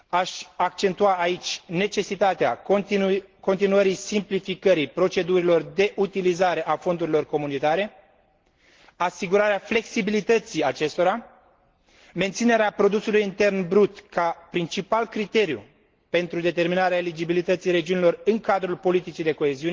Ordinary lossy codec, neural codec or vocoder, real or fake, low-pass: Opus, 16 kbps; none; real; 7.2 kHz